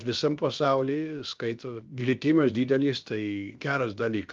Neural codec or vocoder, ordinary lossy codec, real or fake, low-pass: codec, 16 kHz, about 1 kbps, DyCAST, with the encoder's durations; Opus, 32 kbps; fake; 7.2 kHz